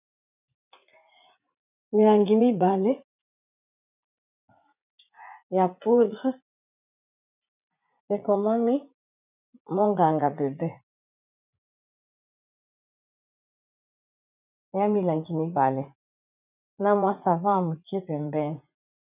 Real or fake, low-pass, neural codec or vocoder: fake; 3.6 kHz; vocoder, 44.1 kHz, 80 mel bands, Vocos